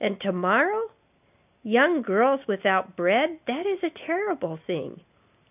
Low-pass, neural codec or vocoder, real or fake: 3.6 kHz; none; real